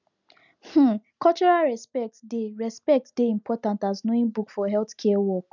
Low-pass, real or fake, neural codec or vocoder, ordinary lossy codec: 7.2 kHz; real; none; none